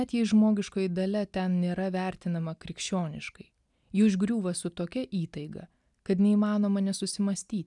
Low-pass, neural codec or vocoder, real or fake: 10.8 kHz; none; real